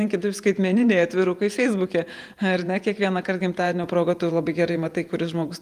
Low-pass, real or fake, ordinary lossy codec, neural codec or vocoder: 14.4 kHz; fake; Opus, 32 kbps; vocoder, 48 kHz, 128 mel bands, Vocos